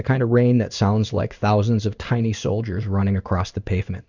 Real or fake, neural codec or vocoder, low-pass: fake; codec, 16 kHz in and 24 kHz out, 1 kbps, XY-Tokenizer; 7.2 kHz